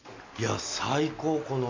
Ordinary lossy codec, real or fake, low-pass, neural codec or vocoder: MP3, 48 kbps; real; 7.2 kHz; none